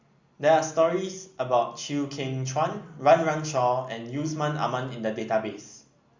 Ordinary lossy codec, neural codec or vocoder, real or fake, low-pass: Opus, 64 kbps; none; real; 7.2 kHz